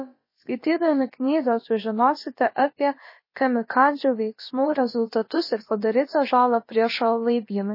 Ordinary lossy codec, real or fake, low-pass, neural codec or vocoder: MP3, 24 kbps; fake; 5.4 kHz; codec, 16 kHz, about 1 kbps, DyCAST, with the encoder's durations